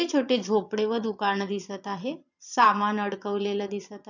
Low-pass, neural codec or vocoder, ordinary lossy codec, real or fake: 7.2 kHz; vocoder, 22.05 kHz, 80 mel bands, Vocos; none; fake